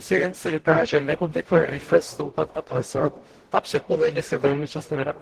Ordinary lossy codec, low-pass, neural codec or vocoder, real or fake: Opus, 16 kbps; 14.4 kHz; codec, 44.1 kHz, 0.9 kbps, DAC; fake